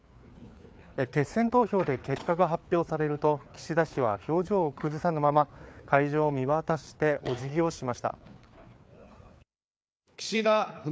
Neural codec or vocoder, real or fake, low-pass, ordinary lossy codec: codec, 16 kHz, 4 kbps, FreqCodec, larger model; fake; none; none